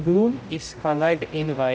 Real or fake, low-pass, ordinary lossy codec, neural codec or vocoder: fake; none; none; codec, 16 kHz, 0.5 kbps, X-Codec, HuBERT features, trained on general audio